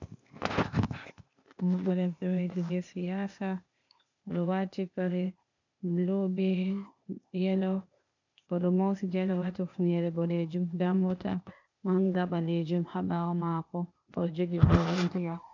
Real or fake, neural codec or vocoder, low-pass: fake; codec, 16 kHz, 0.8 kbps, ZipCodec; 7.2 kHz